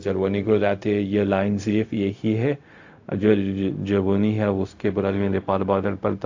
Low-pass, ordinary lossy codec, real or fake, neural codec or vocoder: 7.2 kHz; AAC, 48 kbps; fake; codec, 16 kHz, 0.4 kbps, LongCat-Audio-Codec